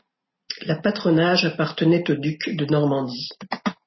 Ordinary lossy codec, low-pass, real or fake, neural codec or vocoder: MP3, 24 kbps; 7.2 kHz; real; none